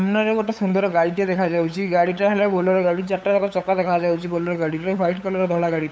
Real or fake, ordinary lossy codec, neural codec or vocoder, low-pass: fake; none; codec, 16 kHz, 8 kbps, FunCodec, trained on LibriTTS, 25 frames a second; none